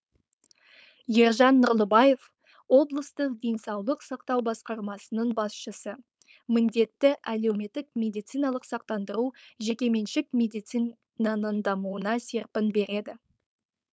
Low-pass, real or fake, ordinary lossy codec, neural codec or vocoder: none; fake; none; codec, 16 kHz, 4.8 kbps, FACodec